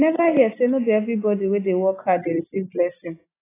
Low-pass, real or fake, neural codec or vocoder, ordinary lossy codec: 3.6 kHz; real; none; AAC, 16 kbps